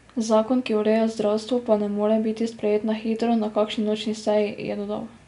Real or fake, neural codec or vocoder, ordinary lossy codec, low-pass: real; none; none; 10.8 kHz